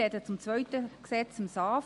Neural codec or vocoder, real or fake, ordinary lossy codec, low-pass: none; real; MP3, 48 kbps; 14.4 kHz